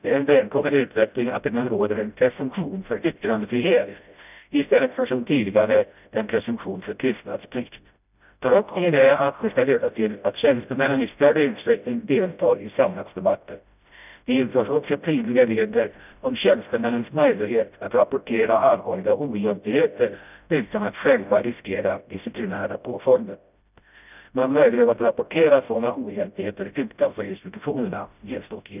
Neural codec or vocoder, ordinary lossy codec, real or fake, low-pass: codec, 16 kHz, 0.5 kbps, FreqCodec, smaller model; none; fake; 3.6 kHz